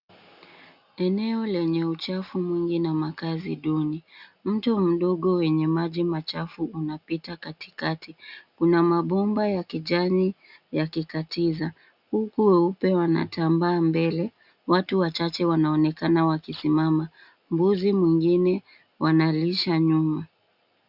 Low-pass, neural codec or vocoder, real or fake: 5.4 kHz; none; real